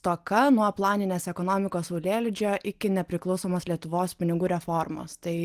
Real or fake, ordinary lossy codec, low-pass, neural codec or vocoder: real; Opus, 24 kbps; 14.4 kHz; none